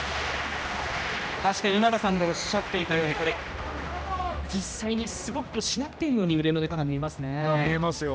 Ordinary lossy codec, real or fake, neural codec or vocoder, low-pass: none; fake; codec, 16 kHz, 1 kbps, X-Codec, HuBERT features, trained on general audio; none